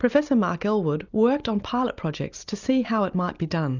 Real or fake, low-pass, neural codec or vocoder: real; 7.2 kHz; none